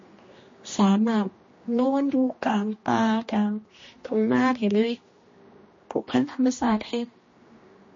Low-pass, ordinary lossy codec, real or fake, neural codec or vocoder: 7.2 kHz; MP3, 32 kbps; fake; codec, 16 kHz, 1 kbps, X-Codec, HuBERT features, trained on general audio